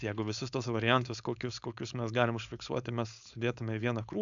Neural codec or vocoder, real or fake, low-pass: codec, 16 kHz, 4.8 kbps, FACodec; fake; 7.2 kHz